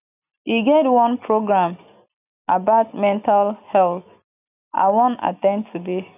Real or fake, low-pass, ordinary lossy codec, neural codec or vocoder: real; 3.6 kHz; none; none